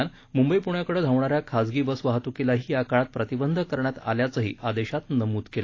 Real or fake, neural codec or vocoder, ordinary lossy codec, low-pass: real; none; AAC, 32 kbps; 7.2 kHz